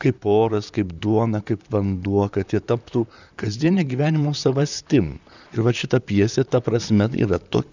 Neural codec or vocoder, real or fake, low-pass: vocoder, 44.1 kHz, 128 mel bands, Pupu-Vocoder; fake; 7.2 kHz